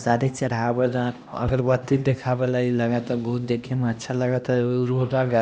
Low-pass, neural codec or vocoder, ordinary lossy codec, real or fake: none; codec, 16 kHz, 1 kbps, X-Codec, HuBERT features, trained on LibriSpeech; none; fake